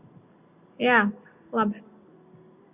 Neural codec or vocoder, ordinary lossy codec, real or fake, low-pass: none; Opus, 64 kbps; real; 3.6 kHz